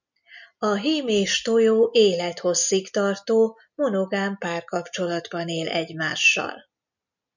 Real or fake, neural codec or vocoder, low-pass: real; none; 7.2 kHz